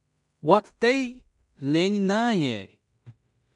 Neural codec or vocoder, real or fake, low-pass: codec, 16 kHz in and 24 kHz out, 0.4 kbps, LongCat-Audio-Codec, two codebook decoder; fake; 10.8 kHz